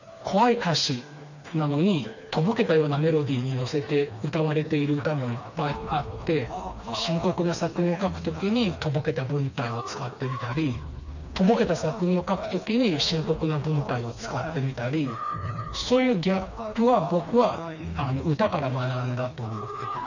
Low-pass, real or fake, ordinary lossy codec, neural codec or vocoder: 7.2 kHz; fake; none; codec, 16 kHz, 2 kbps, FreqCodec, smaller model